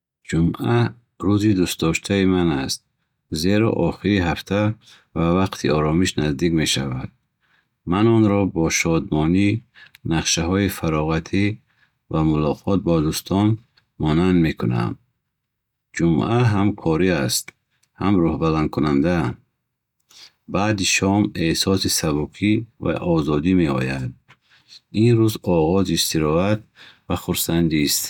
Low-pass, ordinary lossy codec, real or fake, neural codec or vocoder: 19.8 kHz; none; real; none